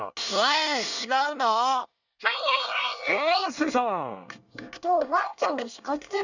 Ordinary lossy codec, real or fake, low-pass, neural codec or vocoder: none; fake; 7.2 kHz; codec, 24 kHz, 1 kbps, SNAC